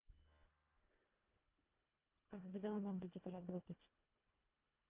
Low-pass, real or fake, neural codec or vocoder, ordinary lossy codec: 3.6 kHz; fake; codec, 24 kHz, 1.5 kbps, HILCodec; Opus, 24 kbps